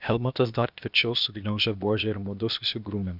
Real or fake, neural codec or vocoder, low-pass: fake; codec, 16 kHz, 0.8 kbps, ZipCodec; 5.4 kHz